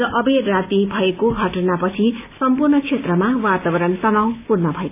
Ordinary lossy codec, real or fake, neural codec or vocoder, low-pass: AAC, 32 kbps; real; none; 3.6 kHz